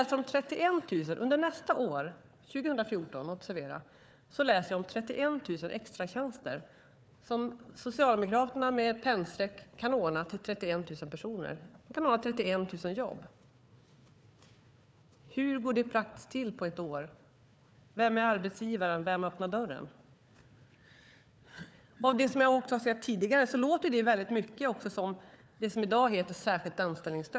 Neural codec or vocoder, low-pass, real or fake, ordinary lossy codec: codec, 16 kHz, 4 kbps, FunCodec, trained on Chinese and English, 50 frames a second; none; fake; none